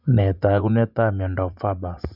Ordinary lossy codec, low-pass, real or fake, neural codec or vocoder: none; 5.4 kHz; fake; vocoder, 24 kHz, 100 mel bands, Vocos